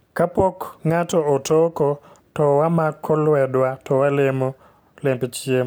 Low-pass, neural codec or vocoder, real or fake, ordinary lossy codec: none; none; real; none